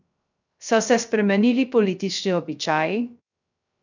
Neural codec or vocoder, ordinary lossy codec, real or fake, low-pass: codec, 16 kHz, 0.3 kbps, FocalCodec; none; fake; 7.2 kHz